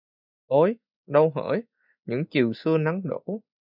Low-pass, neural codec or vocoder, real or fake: 5.4 kHz; none; real